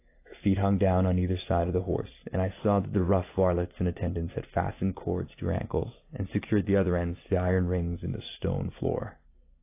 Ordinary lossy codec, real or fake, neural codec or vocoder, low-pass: AAC, 24 kbps; real; none; 3.6 kHz